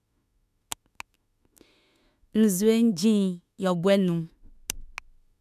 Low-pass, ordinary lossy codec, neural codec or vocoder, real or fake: 14.4 kHz; none; autoencoder, 48 kHz, 32 numbers a frame, DAC-VAE, trained on Japanese speech; fake